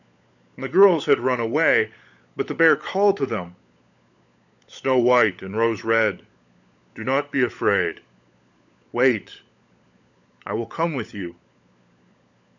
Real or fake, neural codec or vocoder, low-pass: fake; codec, 16 kHz, 16 kbps, FunCodec, trained on LibriTTS, 50 frames a second; 7.2 kHz